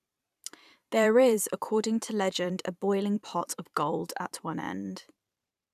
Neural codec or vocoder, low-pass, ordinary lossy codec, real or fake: vocoder, 44.1 kHz, 128 mel bands every 512 samples, BigVGAN v2; 14.4 kHz; none; fake